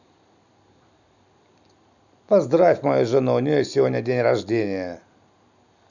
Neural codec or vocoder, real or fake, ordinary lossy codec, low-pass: none; real; none; 7.2 kHz